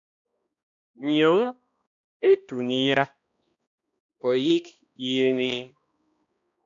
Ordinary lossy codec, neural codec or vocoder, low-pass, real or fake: MP3, 64 kbps; codec, 16 kHz, 1 kbps, X-Codec, HuBERT features, trained on balanced general audio; 7.2 kHz; fake